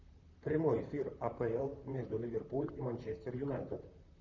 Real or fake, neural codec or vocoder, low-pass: fake; vocoder, 44.1 kHz, 128 mel bands, Pupu-Vocoder; 7.2 kHz